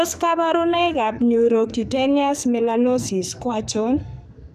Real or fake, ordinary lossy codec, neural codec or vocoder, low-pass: fake; none; codec, 44.1 kHz, 2.6 kbps, SNAC; 14.4 kHz